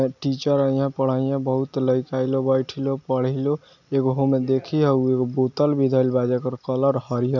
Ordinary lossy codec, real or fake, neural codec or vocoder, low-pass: none; real; none; 7.2 kHz